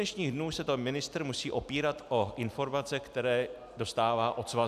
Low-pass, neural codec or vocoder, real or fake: 14.4 kHz; none; real